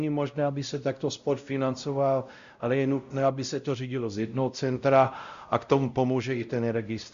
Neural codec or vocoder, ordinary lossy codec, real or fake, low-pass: codec, 16 kHz, 0.5 kbps, X-Codec, WavLM features, trained on Multilingual LibriSpeech; Opus, 64 kbps; fake; 7.2 kHz